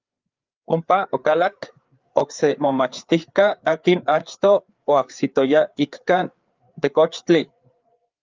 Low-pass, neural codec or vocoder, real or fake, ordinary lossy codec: 7.2 kHz; codec, 16 kHz, 4 kbps, FreqCodec, larger model; fake; Opus, 24 kbps